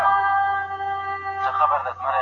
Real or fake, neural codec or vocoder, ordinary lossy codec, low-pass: real; none; MP3, 64 kbps; 7.2 kHz